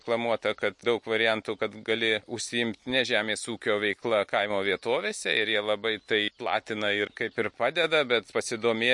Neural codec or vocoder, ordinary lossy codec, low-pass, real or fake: none; MP3, 48 kbps; 10.8 kHz; real